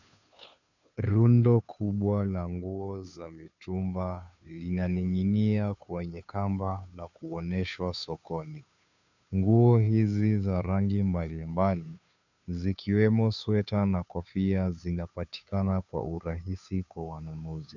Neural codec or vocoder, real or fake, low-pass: codec, 16 kHz, 2 kbps, FunCodec, trained on Chinese and English, 25 frames a second; fake; 7.2 kHz